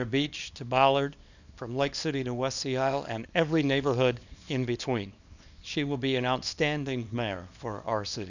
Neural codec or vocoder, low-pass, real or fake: codec, 24 kHz, 0.9 kbps, WavTokenizer, small release; 7.2 kHz; fake